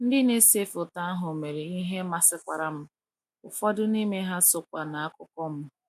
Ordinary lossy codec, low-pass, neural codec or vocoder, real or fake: MP3, 96 kbps; 14.4 kHz; none; real